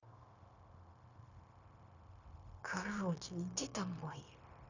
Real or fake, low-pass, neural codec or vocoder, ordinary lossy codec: fake; 7.2 kHz; codec, 16 kHz, 0.9 kbps, LongCat-Audio-Codec; none